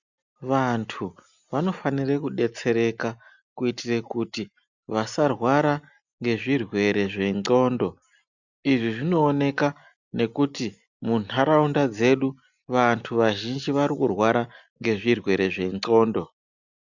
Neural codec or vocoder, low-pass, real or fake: none; 7.2 kHz; real